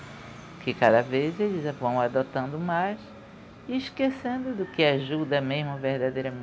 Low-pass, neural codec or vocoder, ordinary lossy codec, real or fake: none; none; none; real